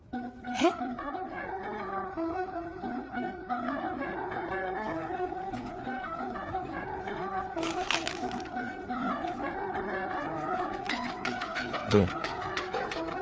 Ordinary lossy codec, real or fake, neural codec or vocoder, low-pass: none; fake; codec, 16 kHz, 4 kbps, FreqCodec, larger model; none